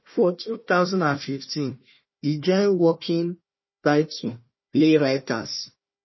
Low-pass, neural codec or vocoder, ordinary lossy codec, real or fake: 7.2 kHz; codec, 16 kHz, 1 kbps, FunCodec, trained on Chinese and English, 50 frames a second; MP3, 24 kbps; fake